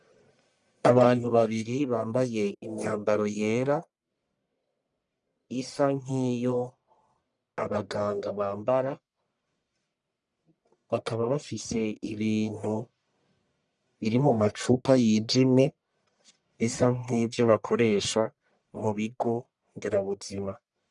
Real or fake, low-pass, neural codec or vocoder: fake; 10.8 kHz; codec, 44.1 kHz, 1.7 kbps, Pupu-Codec